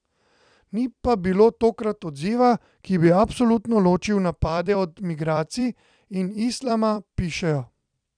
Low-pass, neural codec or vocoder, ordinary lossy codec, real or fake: 9.9 kHz; vocoder, 44.1 kHz, 128 mel bands every 256 samples, BigVGAN v2; none; fake